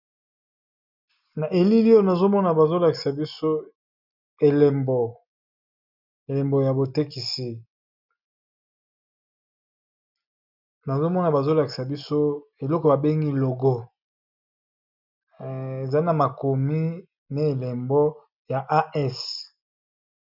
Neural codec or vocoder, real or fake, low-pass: none; real; 5.4 kHz